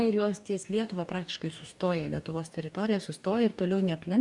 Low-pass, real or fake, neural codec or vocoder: 10.8 kHz; fake; codec, 44.1 kHz, 2.6 kbps, DAC